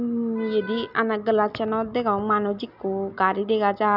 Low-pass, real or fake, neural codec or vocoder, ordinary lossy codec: 5.4 kHz; real; none; none